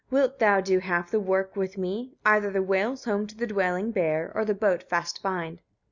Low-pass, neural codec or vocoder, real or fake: 7.2 kHz; none; real